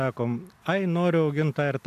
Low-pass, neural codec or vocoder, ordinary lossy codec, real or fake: 14.4 kHz; none; AAC, 96 kbps; real